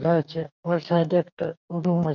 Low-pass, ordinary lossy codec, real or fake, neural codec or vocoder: 7.2 kHz; none; fake; codec, 44.1 kHz, 2.6 kbps, DAC